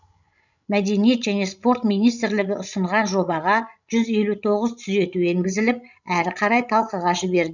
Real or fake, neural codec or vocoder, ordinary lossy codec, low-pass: fake; codec, 16 kHz, 16 kbps, FreqCodec, larger model; none; 7.2 kHz